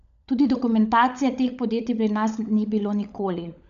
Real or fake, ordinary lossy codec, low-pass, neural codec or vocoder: fake; none; 7.2 kHz; codec, 16 kHz, 16 kbps, FunCodec, trained on LibriTTS, 50 frames a second